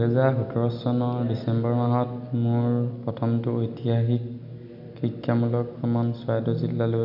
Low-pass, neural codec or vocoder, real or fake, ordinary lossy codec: 5.4 kHz; none; real; none